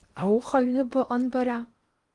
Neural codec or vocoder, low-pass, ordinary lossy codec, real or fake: codec, 16 kHz in and 24 kHz out, 0.8 kbps, FocalCodec, streaming, 65536 codes; 10.8 kHz; Opus, 24 kbps; fake